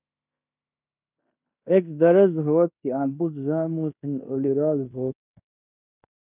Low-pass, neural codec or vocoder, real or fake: 3.6 kHz; codec, 16 kHz in and 24 kHz out, 0.9 kbps, LongCat-Audio-Codec, fine tuned four codebook decoder; fake